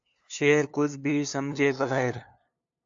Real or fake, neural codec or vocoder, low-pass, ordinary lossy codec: fake; codec, 16 kHz, 2 kbps, FunCodec, trained on LibriTTS, 25 frames a second; 7.2 kHz; AAC, 64 kbps